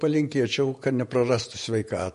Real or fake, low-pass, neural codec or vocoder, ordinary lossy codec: fake; 14.4 kHz; vocoder, 48 kHz, 128 mel bands, Vocos; MP3, 48 kbps